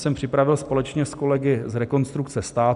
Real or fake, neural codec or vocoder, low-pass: real; none; 10.8 kHz